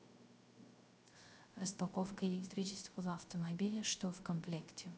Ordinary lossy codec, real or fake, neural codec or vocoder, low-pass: none; fake; codec, 16 kHz, 0.3 kbps, FocalCodec; none